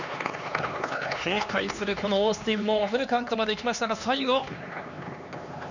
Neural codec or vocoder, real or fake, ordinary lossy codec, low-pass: codec, 16 kHz, 2 kbps, X-Codec, HuBERT features, trained on LibriSpeech; fake; none; 7.2 kHz